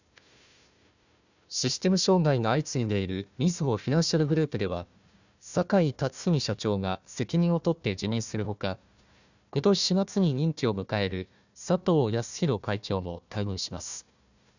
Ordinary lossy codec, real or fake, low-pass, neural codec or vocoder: none; fake; 7.2 kHz; codec, 16 kHz, 1 kbps, FunCodec, trained on Chinese and English, 50 frames a second